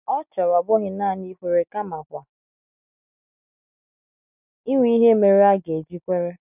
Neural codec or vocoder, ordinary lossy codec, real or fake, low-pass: none; none; real; 3.6 kHz